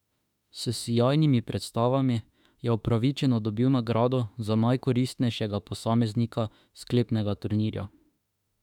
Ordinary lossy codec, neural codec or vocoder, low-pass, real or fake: none; autoencoder, 48 kHz, 32 numbers a frame, DAC-VAE, trained on Japanese speech; 19.8 kHz; fake